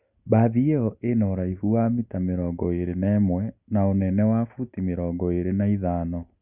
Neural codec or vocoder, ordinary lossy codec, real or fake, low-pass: none; Opus, 64 kbps; real; 3.6 kHz